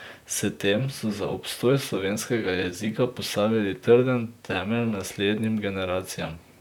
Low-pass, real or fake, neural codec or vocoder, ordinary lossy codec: 19.8 kHz; fake; vocoder, 44.1 kHz, 128 mel bands, Pupu-Vocoder; none